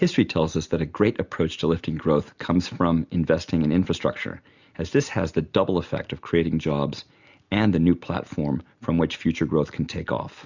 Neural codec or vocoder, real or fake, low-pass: none; real; 7.2 kHz